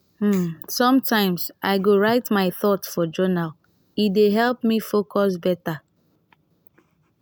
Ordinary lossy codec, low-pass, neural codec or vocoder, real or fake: none; none; none; real